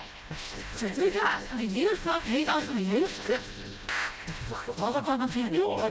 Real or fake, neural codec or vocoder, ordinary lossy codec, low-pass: fake; codec, 16 kHz, 0.5 kbps, FreqCodec, smaller model; none; none